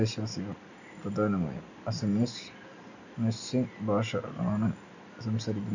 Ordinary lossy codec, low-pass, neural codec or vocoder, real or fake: none; 7.2 kHz; none; real